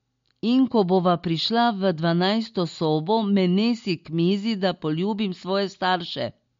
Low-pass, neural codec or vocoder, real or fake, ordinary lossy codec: 7.2 kHz; none; real; MP3, 48 kbps